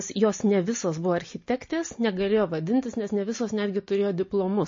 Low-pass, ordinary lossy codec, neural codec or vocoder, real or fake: 7.2 kHz; MP3, 32 kbps; none; real